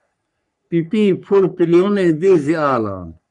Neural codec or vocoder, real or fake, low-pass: codec, 44.1 kHz, 3.4 kbps, Pupu-Codec; fake; 10.8 kHz